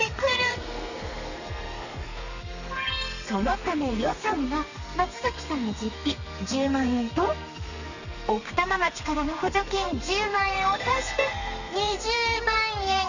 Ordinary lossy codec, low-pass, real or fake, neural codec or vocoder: none; 7.2 kHz; fake; codec, 32 kHz, 1.9 kbps, SNAC